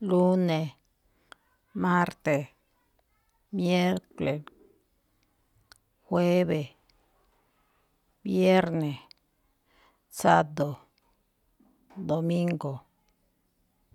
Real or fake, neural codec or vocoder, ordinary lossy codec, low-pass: real; none; none; 19.8 kHz